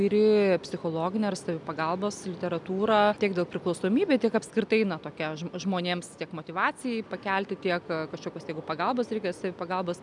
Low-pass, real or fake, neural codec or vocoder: 10.8 kHz; real; none